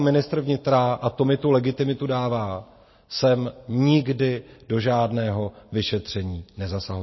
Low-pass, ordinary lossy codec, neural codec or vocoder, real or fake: 7.2 kHz; MP3, 24 kbps; none; real